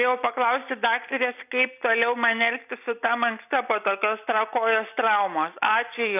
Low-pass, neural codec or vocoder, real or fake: 3.6 kHz; none; real